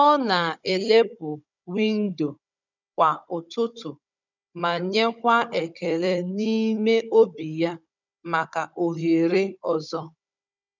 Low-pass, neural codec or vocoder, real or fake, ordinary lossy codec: 7.2 kHz; codec, 16 kHz, 16 kbps, FunCodec, trained on Chinese and English, 50 frames a second; fake; none